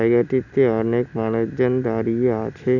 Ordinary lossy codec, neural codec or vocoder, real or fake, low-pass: none; none; real; 7.2 kHz